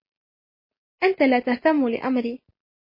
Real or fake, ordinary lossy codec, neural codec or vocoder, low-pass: real; MP3, 24 kbps; none; 5.4 kHz